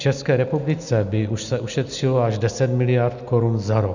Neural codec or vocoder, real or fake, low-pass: none; real; 7.2 kHz